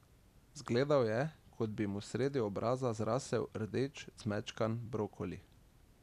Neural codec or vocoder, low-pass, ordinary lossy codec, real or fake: none; 14.4 kHz; none; real